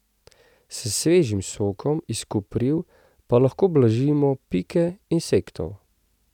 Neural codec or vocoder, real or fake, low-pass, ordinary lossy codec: none; real; 19.8 kHz; none